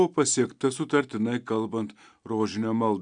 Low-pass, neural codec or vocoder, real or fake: 9.9 kHz; none; real